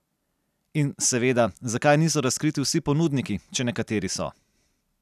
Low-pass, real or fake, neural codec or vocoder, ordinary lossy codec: 14.4 kHz; real; none; none